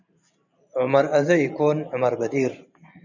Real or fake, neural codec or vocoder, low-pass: fake; vocoder, 44.1 kHz, 128 mel bands every 512 samples, BigVGAN v2; 7.2 kHz